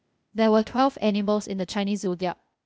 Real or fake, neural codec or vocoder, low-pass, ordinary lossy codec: fake; codec, 16 kHz, 0.8 kbps, ZipCodec; none; none